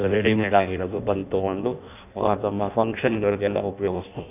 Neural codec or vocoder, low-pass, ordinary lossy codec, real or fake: codec, 16 kHz in and 24 kHz out, 0.6 kbps, FireRedTTS-2 codec; 3.6 kHz; none; fake